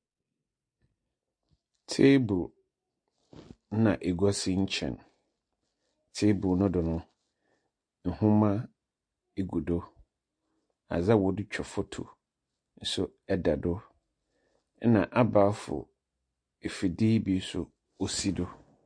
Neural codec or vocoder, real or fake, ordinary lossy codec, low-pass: none; real; MP3, 48 kbps; 9.9 kHz